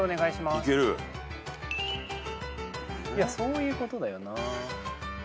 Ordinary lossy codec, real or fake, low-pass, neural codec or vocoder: none; real; none; none